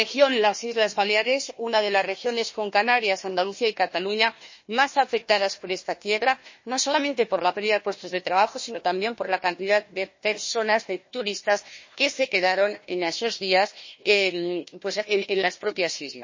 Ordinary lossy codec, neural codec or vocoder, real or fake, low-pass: MP3, 32 kbps; codec, 16 kHz, 1 kbps, FunCodec, trained on Chinese and English, 50 frames a second; fake; 7.2 kHz